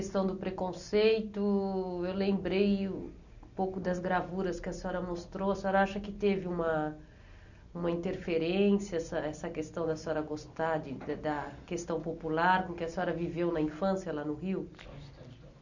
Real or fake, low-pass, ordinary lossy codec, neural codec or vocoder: real; 7.2 kHz; none; none